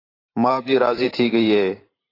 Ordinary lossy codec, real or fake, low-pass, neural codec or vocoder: AAC, 24 kbps; fake; 5.4 kHz; vocoder, 44.1 kHz, 128 mel bands every 512 samples, BigVGAN v2